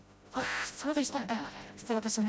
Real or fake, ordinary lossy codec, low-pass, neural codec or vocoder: fake; none; none; codec, 16 kHz, 0.5 kbps, FreqCodec, smaller model